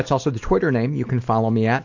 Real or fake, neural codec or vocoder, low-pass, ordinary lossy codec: real; none; 7.2 kHz; AAC, 48 kbps